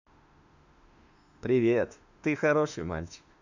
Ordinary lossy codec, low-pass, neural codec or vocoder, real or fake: none; 7.2 kHz; autoencoder, 48 kHz, 32 numbers a frame, DAC-VAE, trained on Japanese speech; fake